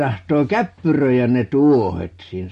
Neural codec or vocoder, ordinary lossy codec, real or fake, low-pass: none; MP3, 48 kbps; real; 14.4 kHz